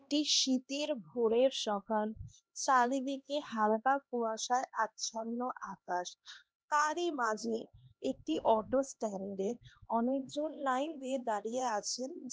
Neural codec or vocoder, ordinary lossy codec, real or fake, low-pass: codec, 16 kHz, 2 kbps, X-Codec, HuBERT features, trained on LibriSpeech; none; fake; none